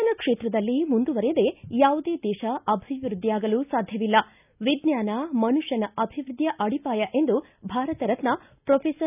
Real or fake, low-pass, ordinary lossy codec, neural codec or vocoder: real; 3.6 kHz; none; none